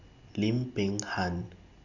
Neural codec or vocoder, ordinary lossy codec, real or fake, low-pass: none; none; real; 7.2 kHz